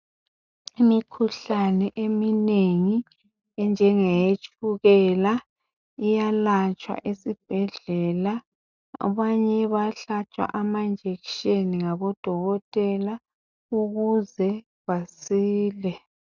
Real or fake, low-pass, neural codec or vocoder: real; 7.2 kHz; none